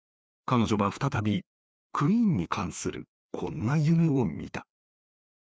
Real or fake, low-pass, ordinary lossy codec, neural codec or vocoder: fake; none; none; codec, 16 kHz, 2 kbps, FreqCodec, larger model